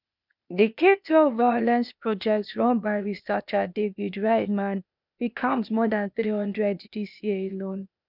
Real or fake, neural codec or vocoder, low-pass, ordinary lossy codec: fake; codec, 16 kHz, 0.8 kbps, ZipCodec; 5.4 kHz; none